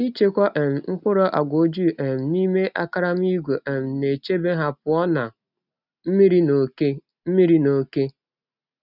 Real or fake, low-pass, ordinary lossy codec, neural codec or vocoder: real; 5.4 kHz; none; none